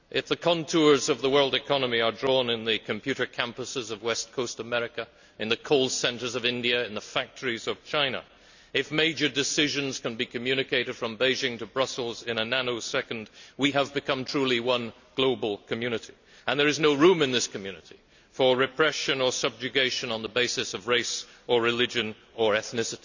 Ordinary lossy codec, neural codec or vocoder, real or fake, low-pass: none; none; real; 7.2 kHz